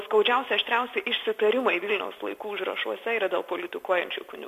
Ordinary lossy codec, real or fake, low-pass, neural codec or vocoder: MP3, 64 kbps; real; 14.4 kHz; none